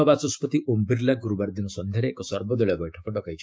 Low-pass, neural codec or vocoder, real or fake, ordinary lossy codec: none; codec, 16 kHz, 6 kbps, DAC; fake; none